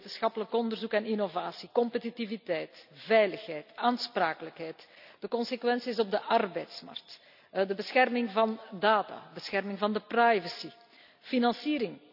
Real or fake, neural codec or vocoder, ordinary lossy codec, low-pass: real; none; none; 5.4 kHz